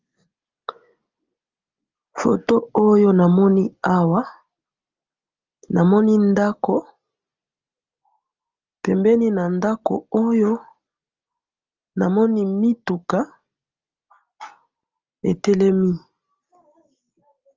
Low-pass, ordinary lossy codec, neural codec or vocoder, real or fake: 7.2 kHz; Opus, 32 kbps; none; real